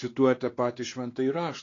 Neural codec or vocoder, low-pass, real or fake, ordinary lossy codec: codec, 16 kHz, 2 kbps, X-Codec, WavLM features, trained on Multilingual LibriSpeech; 7.2 kHz; fake; AAC, 32 kbps